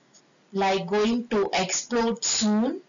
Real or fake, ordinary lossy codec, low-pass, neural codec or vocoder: real; none; 7.2 kHz; none